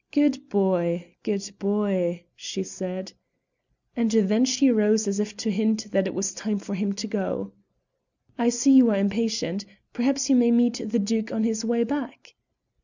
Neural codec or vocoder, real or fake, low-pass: none; real; 7.2 kHz